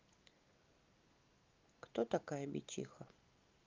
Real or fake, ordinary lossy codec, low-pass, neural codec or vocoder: real; Opus, 32 kbps; 7.2 kHz; none